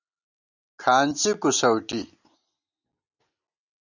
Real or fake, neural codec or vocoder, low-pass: real; none; 7.2 kHz